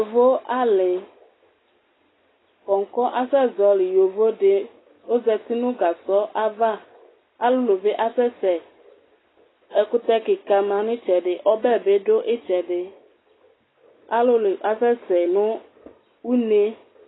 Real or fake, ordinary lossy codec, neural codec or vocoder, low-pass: real; AAC, 16 kbps; none; 7.2 kHz